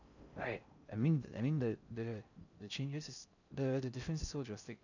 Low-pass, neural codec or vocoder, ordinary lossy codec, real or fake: 7.2 kHz; codec, 16 kHz in and 24 kHz out, 0.6 kbps, FocalCodec, streaming, 4096 codes; none; fake